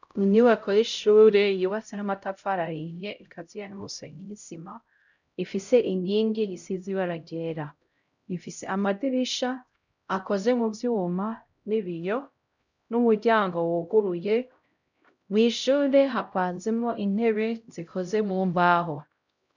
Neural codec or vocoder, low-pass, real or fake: codec, 16 kHz, 0.5 kbps, X-Codec, HuBERT features, trained on LibriSpeech; 7.2 kHz; fake